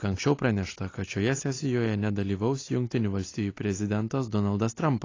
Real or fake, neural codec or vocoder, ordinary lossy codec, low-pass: real; none; AAC, 32 kbps; 7.2 kHz